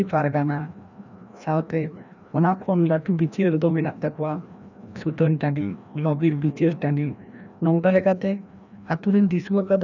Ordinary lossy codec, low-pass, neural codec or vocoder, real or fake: none; 7.2 kHz; codec, 16 kHz, 1 kbps, FreqCodec, larger model; fake